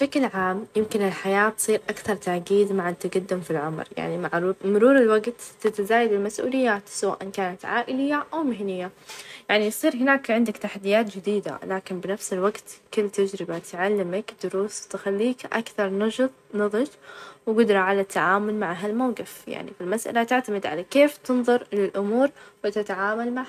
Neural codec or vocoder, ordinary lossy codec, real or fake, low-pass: none; none; real; 14.4 kHz